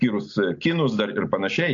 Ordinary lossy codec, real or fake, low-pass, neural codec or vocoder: AAC, 64 kbps; real; 7.2 kHz; none